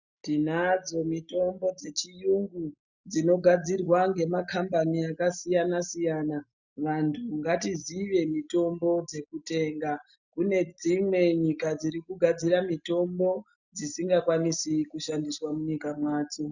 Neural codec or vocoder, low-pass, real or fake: none; 7.2 kHz; real